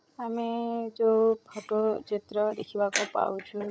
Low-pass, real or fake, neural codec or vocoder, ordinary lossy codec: none; fake; codec, 16 kHz, 16 kbps, FreqCodec, larger model; none